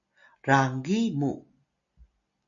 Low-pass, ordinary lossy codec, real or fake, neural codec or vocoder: 7.2 kHz; MP3, 96 kbps; real; none